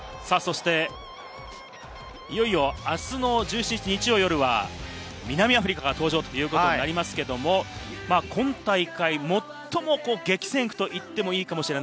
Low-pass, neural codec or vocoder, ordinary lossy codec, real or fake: none; none; none; real